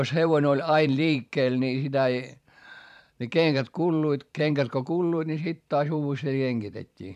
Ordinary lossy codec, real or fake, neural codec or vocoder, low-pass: none; fake; vocoder, 44.1 kHz, 128 mel bands every 512 samples, BigVGAN v2; 14.4 kHz